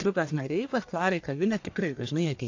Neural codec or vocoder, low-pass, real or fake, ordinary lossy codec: codec, 44.1 kHz, 1.7 kbps, Pupu-Codec; 7.2 kHz; fake; AAC, 48 kbps